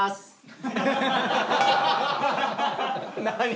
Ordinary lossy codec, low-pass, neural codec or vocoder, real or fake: none; none; none; real